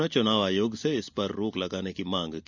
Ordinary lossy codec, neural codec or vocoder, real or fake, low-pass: none; none; real; 7.2 kHz